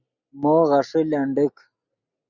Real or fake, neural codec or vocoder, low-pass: real; none; 7.2 kHz